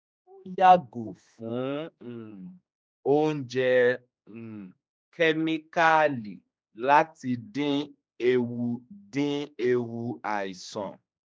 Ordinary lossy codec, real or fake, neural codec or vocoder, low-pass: none; fake; codec, 16 kHz, 2 kbps, X-Codec, HuBERT features, trained on general audio; none